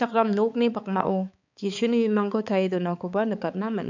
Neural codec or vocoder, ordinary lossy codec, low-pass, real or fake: codec, 16 kHz, 4 kbps, X-Codec, HuBERT features, trained on balanced general audio; none; 7.2 kHz; fake